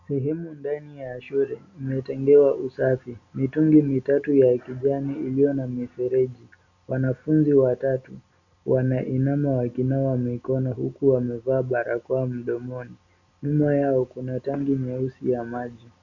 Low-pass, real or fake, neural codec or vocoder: 7.2 kHz; real; none